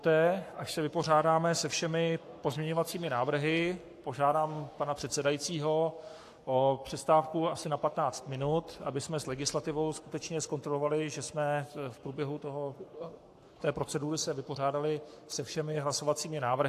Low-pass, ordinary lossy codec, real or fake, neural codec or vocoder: 14.4 kHz; AAC, 64 kbps; fake; codec, 44.1 kHz, 7.8 kbps, Pupu-Codec